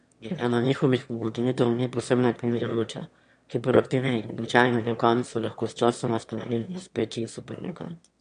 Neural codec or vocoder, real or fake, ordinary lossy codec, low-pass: autoencoder, 22.05 kHz, a latent of 192 numbers a frame, VITS, trained on one speaker; fake; MP3, 64 kbps; 9.9 kHz